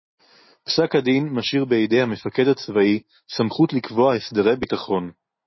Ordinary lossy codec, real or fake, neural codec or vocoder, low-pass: MP3, 24 kbps; real; none; 7.2 kHz